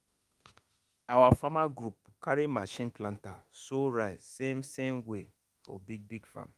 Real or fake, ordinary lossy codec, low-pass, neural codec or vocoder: fake; Opus, 32 kbps; 14.4 kHz; autoencoder, 48 kHz, 32 numbers a frame, DAC-VAE, trained on Japanese speech